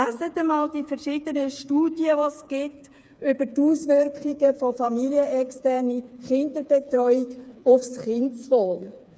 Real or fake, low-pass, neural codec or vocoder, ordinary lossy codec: fake; none; codec, 16 kHz, 4 kbps, FreqCodec, smaller model; none